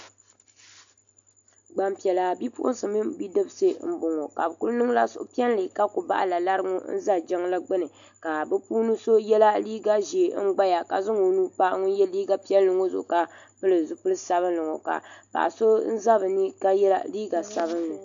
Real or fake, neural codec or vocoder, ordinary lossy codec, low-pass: real; none; MP3, 64 kbps; 7.2 kHz